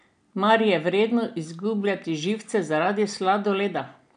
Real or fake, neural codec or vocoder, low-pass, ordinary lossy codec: real; none; 9.9 kHz; none